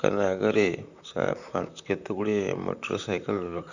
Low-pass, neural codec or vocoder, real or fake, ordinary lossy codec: 7.2 kHz; vocoder, 44.1 kHz, 128 mel bands, Pupu-Vocoder; fake; none